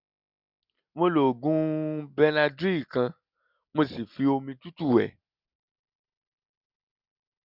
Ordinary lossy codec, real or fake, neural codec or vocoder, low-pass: none; real; none; 5.4 kHz